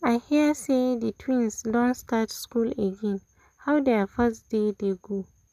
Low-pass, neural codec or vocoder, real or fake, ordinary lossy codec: 14.4 kHz; none; real; none